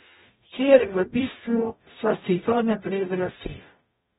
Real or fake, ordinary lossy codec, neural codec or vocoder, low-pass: fake; AAC, 16 kbps; codec, 44.1 kHz, 0.9 kbps, DAC; 19.8 kHz